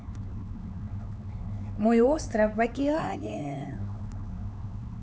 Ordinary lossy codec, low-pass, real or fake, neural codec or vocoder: none; none; fake; codec, 16 kHz, 4 kbps, X-Codec, HuBERT features, trained on LibriSpeech